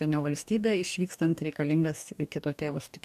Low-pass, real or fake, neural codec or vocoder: 14.4 kHz; fake; codec, 44.1 kHz, 2.6 kbps, DAC